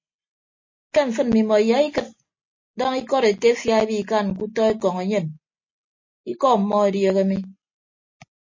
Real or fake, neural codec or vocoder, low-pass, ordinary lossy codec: real; none; 7.2 kHz; MP3, 32 kbps